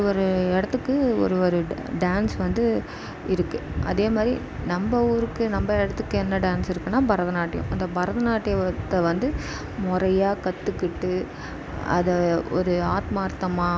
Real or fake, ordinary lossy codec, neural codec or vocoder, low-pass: real; none; none; none